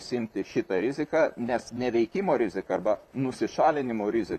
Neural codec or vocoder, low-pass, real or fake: vocoder, 44.1 kHz, 128 mel bands, Pupu-Vocoder; 14.4 kHz; fake